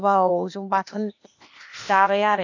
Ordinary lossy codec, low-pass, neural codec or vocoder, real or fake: AAC, 48 kbps; 7.2 kHz; codec, 16 kHz, 0.8 kbps, ZipCodec; fake